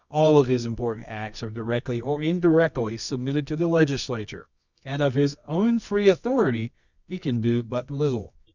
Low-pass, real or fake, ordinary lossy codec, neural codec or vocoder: 7.2 kHz; fake; Opus, 64 kbps; codec, 24 kHz, 0.9 kbps, WavTokenizer, medium music audio release